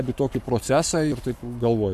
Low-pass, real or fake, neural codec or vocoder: 14.4 kHz; fake; codec, 44.1 kHz, 7.8 kbps, Pupu-Codec